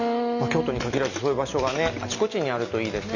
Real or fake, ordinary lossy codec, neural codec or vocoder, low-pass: real; none; none; 7.2 kHz